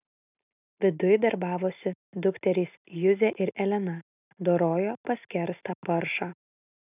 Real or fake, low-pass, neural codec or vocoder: real; 3.6 kHz; none